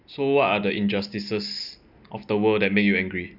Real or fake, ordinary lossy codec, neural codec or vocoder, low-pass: real; none; none; 5.4 kHz